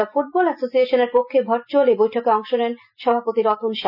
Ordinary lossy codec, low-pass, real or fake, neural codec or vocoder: none; 5.4 kHz; real; none